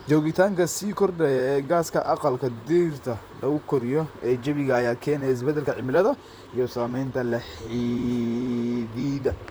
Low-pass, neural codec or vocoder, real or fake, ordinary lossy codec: none; vocoder, 44.1 kHz, 128 mel bands, Pupu-Vocoder; fake; none